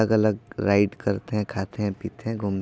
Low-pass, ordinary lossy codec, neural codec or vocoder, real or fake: none; none; none; real